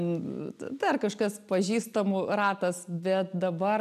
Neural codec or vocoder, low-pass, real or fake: none; 14.4 kHz; real